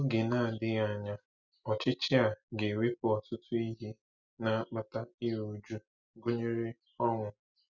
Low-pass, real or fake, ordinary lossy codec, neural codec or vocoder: 7.2 kHz; real; none; none